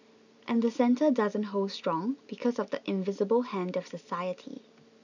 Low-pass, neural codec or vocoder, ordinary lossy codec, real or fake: 7.2 kHz; none; none; real